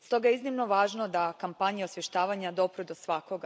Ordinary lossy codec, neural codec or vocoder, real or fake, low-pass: none; none; real; none